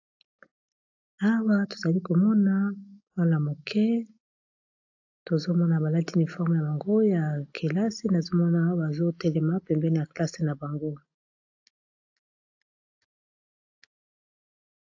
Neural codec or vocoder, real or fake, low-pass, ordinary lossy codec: none; real; 7.2 kHz; AAC, 48 kbps